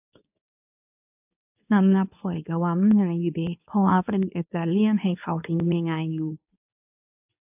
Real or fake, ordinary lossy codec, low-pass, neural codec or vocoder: fake; AAC, 32 kbps; 3.6 kHz; codec, 24 kHz, 0.9 kbps, WavTokenizer, medium speech release version 2